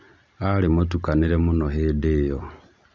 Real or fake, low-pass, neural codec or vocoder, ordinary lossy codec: real; none; none; none